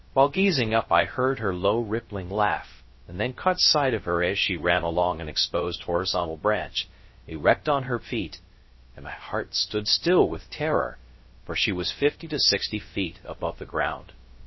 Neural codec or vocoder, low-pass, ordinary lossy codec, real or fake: codec, 16 kHz, 0.3 kbps, FocalCodec; 7.2 kHz; MP3, 24 kbps; fake